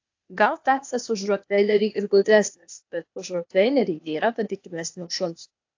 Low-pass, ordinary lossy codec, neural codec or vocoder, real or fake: 7.2 kHz; AAC, 48 kbps; codec, 16 kHz, 0.8 kbps, ZipCodec; fake